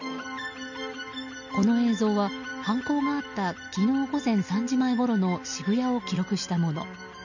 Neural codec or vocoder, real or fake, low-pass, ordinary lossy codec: none; real; 7.2 kHz; none